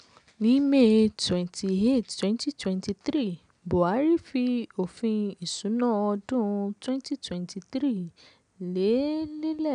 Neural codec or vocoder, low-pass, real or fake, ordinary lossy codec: none; 9.9 kHz; real; none